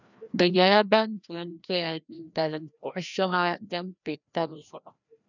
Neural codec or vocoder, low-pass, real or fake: codec, 16 kHz, 1 kbps, FreqCodec, larger model; 7.2 kHz; fake